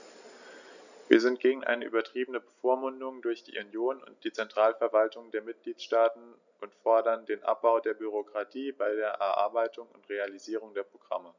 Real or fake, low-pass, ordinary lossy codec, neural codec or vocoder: real; 7.2 kHz; none; none